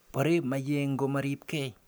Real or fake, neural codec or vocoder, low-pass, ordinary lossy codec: real; none; none; none